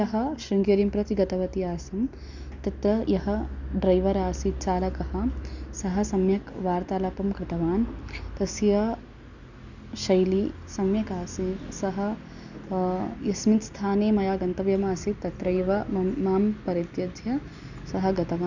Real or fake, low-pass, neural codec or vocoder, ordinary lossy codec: real; 7.2 kHz; none; none